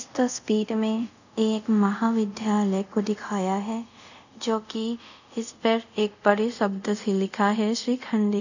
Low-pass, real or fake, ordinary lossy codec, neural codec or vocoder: 7.2 kHz; fake; none; codec, 24 kHz, 0.5 kbps, DualCodec